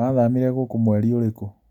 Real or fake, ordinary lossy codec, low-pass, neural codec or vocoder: real; none; 19.8 kHz; none